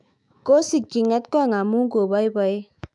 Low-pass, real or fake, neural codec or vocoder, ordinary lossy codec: 10.8 kHz; fake; autoencoder, 48 kHz, 128 numbers a frame, DAC-VAE, trained on Japanese speech; none